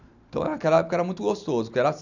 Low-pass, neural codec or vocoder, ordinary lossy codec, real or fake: 7.2 kHz; none; none; real